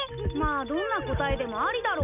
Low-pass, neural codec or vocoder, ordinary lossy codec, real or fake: 3.6 kHz; none; none; real